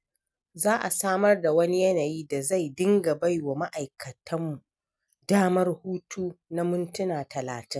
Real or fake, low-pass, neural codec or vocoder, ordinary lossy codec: real; none; none; none